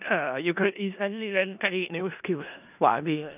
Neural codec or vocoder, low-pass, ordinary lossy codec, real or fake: codec, 16 kHz in and 24 kHz out, 0.4 kbps, LongCat-Audio-Codec, four codebook decoder; 3.6 kHz; none; fake